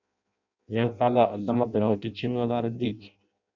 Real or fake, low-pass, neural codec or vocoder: fake; 7.2 kHz; codec, 16 kHz in and 24 kHz out, 0.6 kbps, FireRedTTS-2 codec